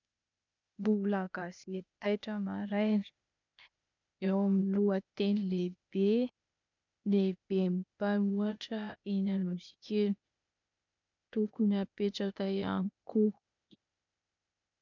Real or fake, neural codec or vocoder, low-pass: fake; codec, 16 kHz, 0.8 kbps, ZipCodec; 7.2 kHz